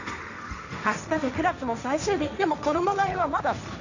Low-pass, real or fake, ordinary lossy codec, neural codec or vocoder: 7.2 kHz; fake; none; codec, 16 kHz, 1.1 kbps, Voila-Tokenizer